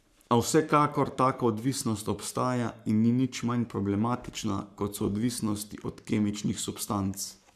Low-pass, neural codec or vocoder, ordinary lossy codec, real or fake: 14.4 kHz; codec, 44.1 kHz, 7.8 kbps, Pupu-Codec; none; fake